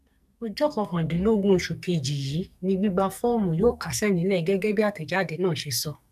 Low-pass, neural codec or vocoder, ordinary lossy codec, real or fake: 14.4 kHz; codec, 44.1 kHz, 2.6 kbps, SNAC; none; fake